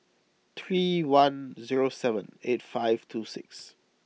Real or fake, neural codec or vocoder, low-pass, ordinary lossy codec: real; none; none; none